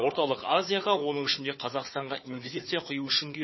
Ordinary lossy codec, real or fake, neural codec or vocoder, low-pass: MP3, 24 kbps; fake; codec, 16 kHz, 4 kbps, FunCodec, trained on Chinese and English, 50 frames a second; 7.2 kHz